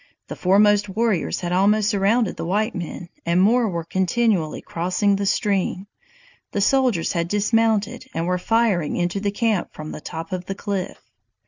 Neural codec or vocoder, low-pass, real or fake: none; 7.2 kHz; real